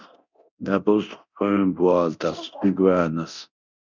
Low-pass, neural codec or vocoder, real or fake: 7.2 kHz; codec, 24 kHz, 0.9 kbps, DualCodec; fake